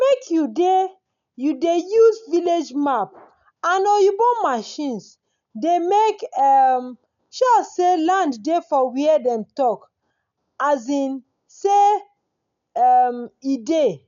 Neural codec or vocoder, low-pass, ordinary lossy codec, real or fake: none; 7.2 kHz; none; real